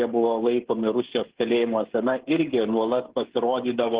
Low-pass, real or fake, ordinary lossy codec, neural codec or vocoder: 3.6 kHz; fake; Opus, 16 kbps; codec, 16 kHz, 16 kbps, FreqCodec, smaller model